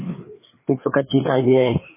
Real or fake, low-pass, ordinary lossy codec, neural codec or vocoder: fake; 3.6 kHz; MP3, 16 kbps; codec, 16 kHz, 2 kbps, FreqCodec, larger model